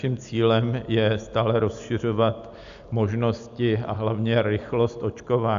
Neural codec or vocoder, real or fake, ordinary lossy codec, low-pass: none; real; AAC, 96 kbps; 7.2 kHz